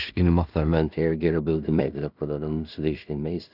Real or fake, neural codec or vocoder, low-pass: fake; codec, 16 kHz in and 24 kHz out, 0.4 kbps, LongCat-Audio-Codec, two codebook decoder; 5.4 kHz